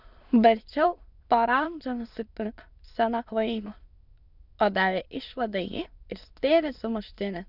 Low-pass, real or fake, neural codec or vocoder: 5.4 kHz; fake; autoencoder, 22.05 kHz, a latent of 192 numbers a frame, VITS, trained on many speakers